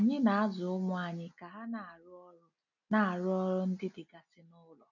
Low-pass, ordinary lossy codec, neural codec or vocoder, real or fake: 7.2 kHz; none; none; real